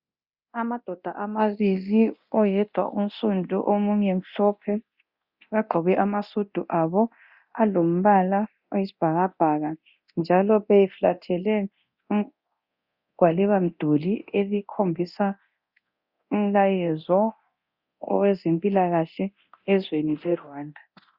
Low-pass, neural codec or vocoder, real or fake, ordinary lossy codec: 5.4 kHz; codec, 24 kHz, 0.9 kbps, DualCodec; fake; Opus, 64 kbps